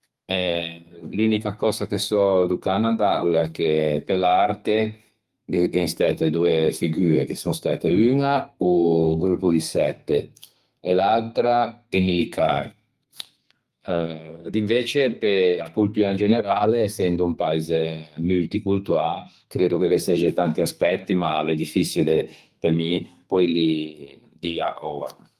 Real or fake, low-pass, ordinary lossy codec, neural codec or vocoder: fake; 14.4 kHz; Opus, 32 kbps; codec, 32 kHz, 1.9 kbps, SNAC